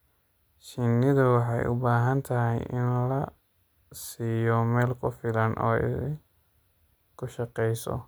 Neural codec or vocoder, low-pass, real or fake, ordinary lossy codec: none; none; real; none